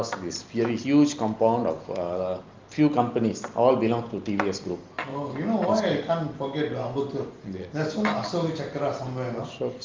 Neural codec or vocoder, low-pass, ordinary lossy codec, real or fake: none; 7.2 kHz; Opus, 32 kbps; real